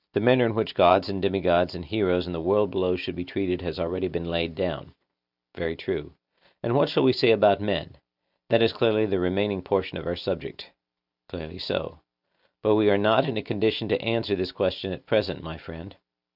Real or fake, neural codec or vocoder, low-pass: real; none; 5.4 kHz